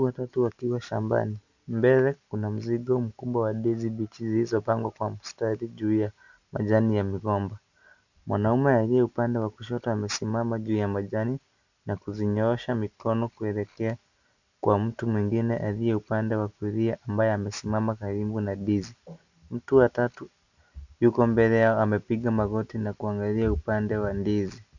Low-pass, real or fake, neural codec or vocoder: 7.2 kHz; real; none